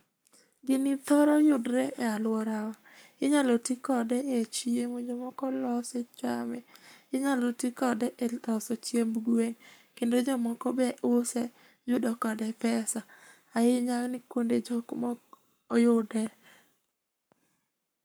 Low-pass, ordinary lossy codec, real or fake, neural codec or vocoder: none; none; fake; codec, 44.1 kHz, 7.8 kbps, Pupu-Codec